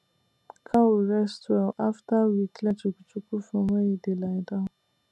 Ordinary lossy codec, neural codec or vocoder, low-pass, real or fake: none; none; none; real